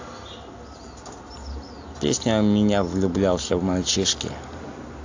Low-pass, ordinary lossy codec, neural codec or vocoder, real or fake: 7.2 kHz; AAC, 48 kbps; none; real